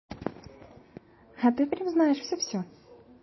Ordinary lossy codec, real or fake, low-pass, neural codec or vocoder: MP3, 24 kbps; real; 7.2 kHz; none